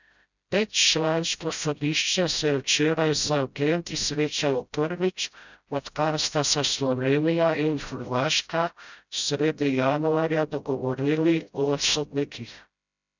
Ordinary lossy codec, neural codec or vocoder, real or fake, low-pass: none; codec, 16 kHz, 0.5 kbps, FreqCodec, smaller model; fake; 7.2 kHz